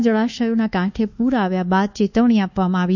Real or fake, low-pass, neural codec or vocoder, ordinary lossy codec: fake; 7.2 kHz; codec, 24 kHz, 1.2 kbps, DualCodec; none